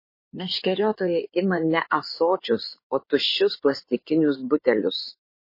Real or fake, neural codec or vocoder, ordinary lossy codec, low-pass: fake; codec, 16 kHz in and 24 kHz out, 2.2 kbps, FireRedTTS-2 codec; MP3, 24 kbps; 5.4 kHz